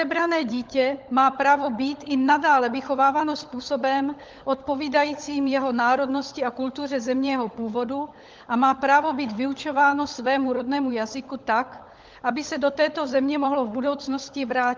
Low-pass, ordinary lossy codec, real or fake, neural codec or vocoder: 7.2 kHz; Opus, 32 kbps; fake; codec, 16 kHz, 16 kbps, FunCodec, trained on LibriTTS, 50 frames a second